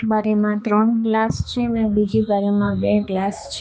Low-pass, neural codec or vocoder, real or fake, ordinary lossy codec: none; codec, 16 kHz, 2 kbps, X-Codec, HuBERT features, trained on balanced general audio; fake; none